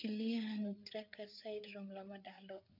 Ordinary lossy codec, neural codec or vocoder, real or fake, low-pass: MP3, 48 kbps; codec, 16 kHz, 4 kbps, FreqCodec, larger model; fake; 5.4 kHz